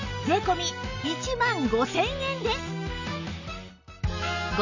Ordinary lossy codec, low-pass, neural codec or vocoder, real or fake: none; 7.2 kHz; none; real